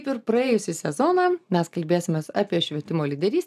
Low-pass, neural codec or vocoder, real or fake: 14.4 kHz; none; real